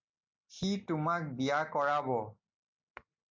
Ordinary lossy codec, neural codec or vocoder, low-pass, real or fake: MP3, 64 kbps; none; 7.2 kHz; real